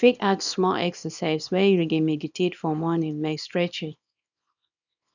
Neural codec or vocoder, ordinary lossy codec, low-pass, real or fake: codec, 24 kHz, 0.9 kbps, WavTokenizer, small release; none; 7.2 kHz; fake